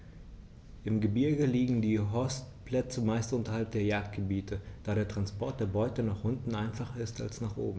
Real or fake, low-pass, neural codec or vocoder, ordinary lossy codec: real; none; none; none